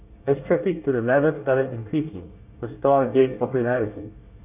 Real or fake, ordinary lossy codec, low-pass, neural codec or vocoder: fake; none; 3.6 kHz; codec, 24 kHz, 1 kbps, SNAC